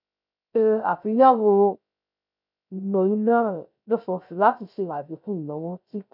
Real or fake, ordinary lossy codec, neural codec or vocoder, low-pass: fake; none; codec, 16 kHz, 0.3 kbps, FocalCodec; 5.4 kHz